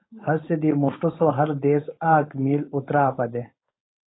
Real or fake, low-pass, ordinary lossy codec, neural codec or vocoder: fake; 7.2 kHz; AAC, 16 kbps; codec, 16 kHz, 4.8 kbps, FACodec